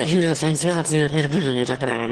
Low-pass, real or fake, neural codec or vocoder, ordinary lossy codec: 9.9 kHz; fake; autoencoder, 22.05 kHz, a latent of 192 numbers a frame, VITS, trained on one speaker; Opus, 16 kbps